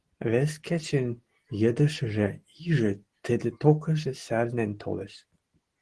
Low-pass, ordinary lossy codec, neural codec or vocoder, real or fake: 10.8 kHz; Opus, 16 kbps; none; real